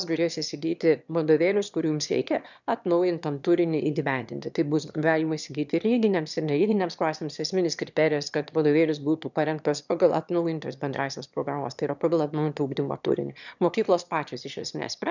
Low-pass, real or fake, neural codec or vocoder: 7.2 kHz; fake; autoencoder, 22.05 kHz, a latent of 192 numbers a frame, VITS, trained on one speaker